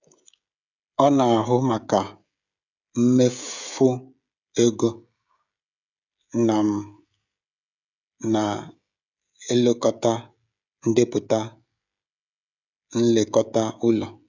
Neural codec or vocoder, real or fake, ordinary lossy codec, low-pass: codec, 16 kHz, 16 kbps, FreqCodec, smaller model; fake; none; 7.2 kHz